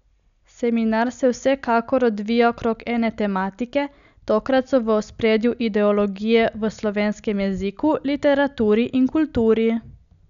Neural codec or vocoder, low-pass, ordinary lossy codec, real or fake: none; 7.2 kHz; none; real